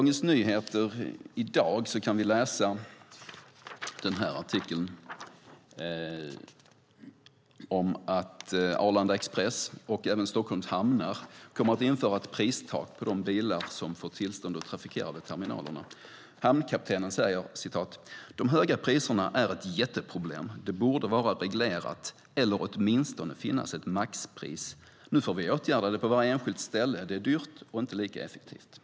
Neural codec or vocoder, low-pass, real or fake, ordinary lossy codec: none; none; real; none